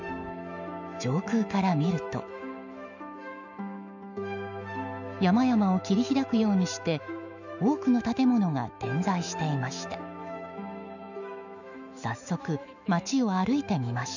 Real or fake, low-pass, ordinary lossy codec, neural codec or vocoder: fake; 7.2 kHz; none; autoencoder, 48 kHz, 128 numbers a frame, DAC-VAE, trained on Japanese speech